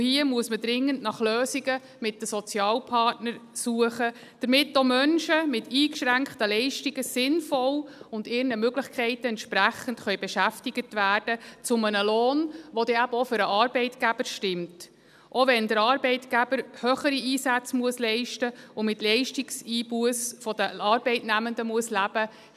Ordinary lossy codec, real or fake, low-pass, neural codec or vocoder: none; real; 14.4 kHz; none